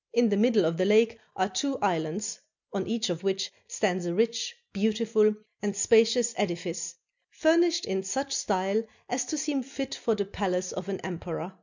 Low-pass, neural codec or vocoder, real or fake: 7.2 kHz; none; real